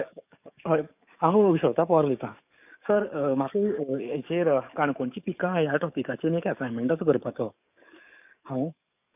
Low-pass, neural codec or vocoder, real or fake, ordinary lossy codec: 3.6 kHz; codec, 44.1 kHz, 7.8 kbps, DAC; fake; none